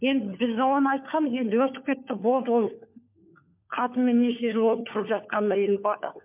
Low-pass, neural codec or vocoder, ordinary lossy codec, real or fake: 3.6 kHz; codec, 16 kHz, 4 kbps, FunCodec, trained on LibriTTS, 50 frames a second; MP3, 32 kbps; fake